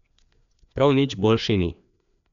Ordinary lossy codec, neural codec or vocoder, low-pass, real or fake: none; codec, 16 kHz, 2 kbps, FreqCodec, larger model; 7.2 kHz; fake